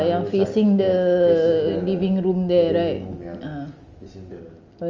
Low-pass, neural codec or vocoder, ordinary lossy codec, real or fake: 7.2 kHz; none; Opus, 32 kbps; real